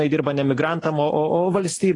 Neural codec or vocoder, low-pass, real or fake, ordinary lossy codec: none; 10.8 kHz; real; AAC, 32 kbps